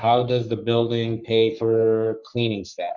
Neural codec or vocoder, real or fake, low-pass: codec, 44.1 kHz, 3.4 kbps, Pupu-Codec; fake; 7.2 kHz